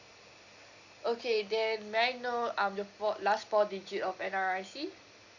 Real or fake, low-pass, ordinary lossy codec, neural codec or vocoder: real; 7.2 kHz; Opus, 64 kbps; none